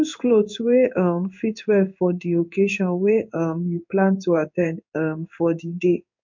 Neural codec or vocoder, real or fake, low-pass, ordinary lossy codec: codec, 16 kHz in and 24 kHz out, 1 kbps, XY-Tokenizer; fake; 7.2 kHz; MP3, 48 kbps